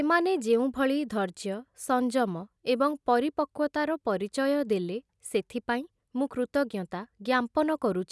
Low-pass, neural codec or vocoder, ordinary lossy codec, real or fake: none; none; none; real